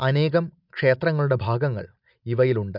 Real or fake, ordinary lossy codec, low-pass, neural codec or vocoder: real; none; 5.4 kHz; none